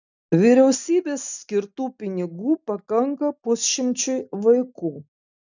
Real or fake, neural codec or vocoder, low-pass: real; none; 7.2 kHz